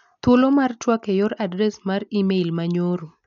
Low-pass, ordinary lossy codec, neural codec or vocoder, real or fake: 7.2 kHz; none; none; real